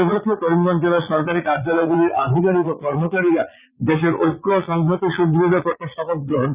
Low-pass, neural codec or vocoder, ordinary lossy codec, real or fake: 3.6 kHz; vocoder, 22.05 kHz, 80 mel bands, Vocos; Opus, 64 kbps; fake